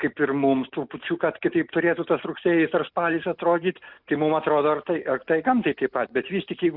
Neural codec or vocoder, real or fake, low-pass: none; real; 5.4 kHz